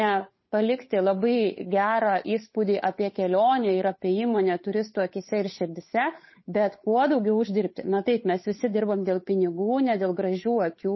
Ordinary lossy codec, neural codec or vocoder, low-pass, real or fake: MP3, 24 kbps; codec, 16 kHz, 8 kbps, FunCodec, trained on Chinese and English, 25 frames a second; 7.2 kHz; fake